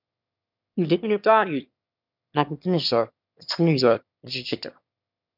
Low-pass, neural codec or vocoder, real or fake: 5.4 kHz; autoencoder, 22.05 kHz, a latent of 192 numbers a frame, VITS, trained on one speaker; fake